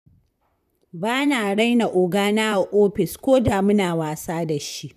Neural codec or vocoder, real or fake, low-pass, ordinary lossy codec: vocoder, 44.1 kHz, 128 mel bands, Pupu-Vocoder; fake; 14.4 kHz; none